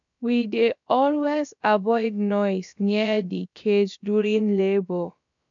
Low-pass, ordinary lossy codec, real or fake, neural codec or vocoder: 7.2 kHz; MP3, 64 kbps; fake; codec, 16 kHz, about 1 kbps, DyCAST, with the encoder's durations